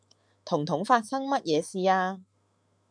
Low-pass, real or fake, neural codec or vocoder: 9.9 kHz; fake; autoencoder, 48 kHz, 128 numbers a frame, DAC-VAE, trained on Japanese speech